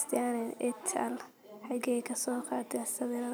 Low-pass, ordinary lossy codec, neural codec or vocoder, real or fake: none; none; vocoder, 44.1 kHz, 128 mel bands every 256 samples, BigVGAN v2; fake